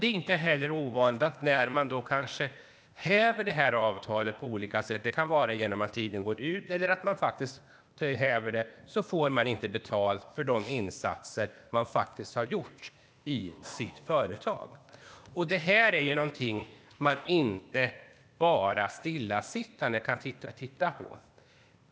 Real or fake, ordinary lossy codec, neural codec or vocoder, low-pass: fake; none; codec, 16 kHz, 0.8 kbps, ZipCodec; none